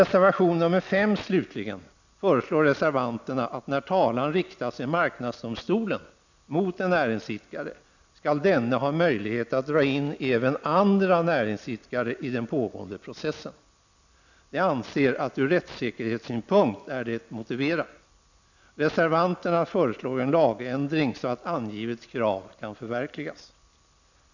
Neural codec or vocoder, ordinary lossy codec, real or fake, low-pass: none; none; real; 7.2 kHz